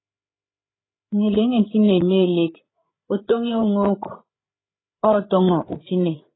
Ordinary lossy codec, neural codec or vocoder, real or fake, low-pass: AAC, 16 kbps; codec, 16 kHz, 8 kbps, FreqCodec, larger model; fake; 7.2 kHz